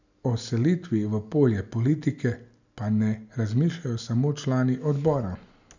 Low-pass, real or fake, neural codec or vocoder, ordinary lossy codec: 7.2 kHz; real; none; none